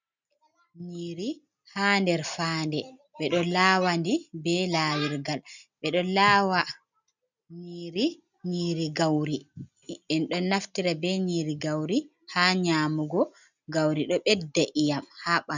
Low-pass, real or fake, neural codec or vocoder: 7.2 kHz; real; none